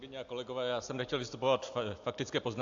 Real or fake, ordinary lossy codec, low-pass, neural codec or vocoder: real; AAC, 64 kbps; 7.2 kHz; none